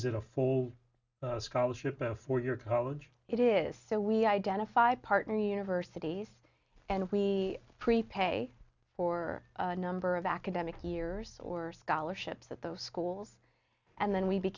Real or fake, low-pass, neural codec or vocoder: real; 7.2 kHz; none